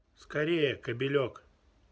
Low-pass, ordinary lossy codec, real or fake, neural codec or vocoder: none; none; real; none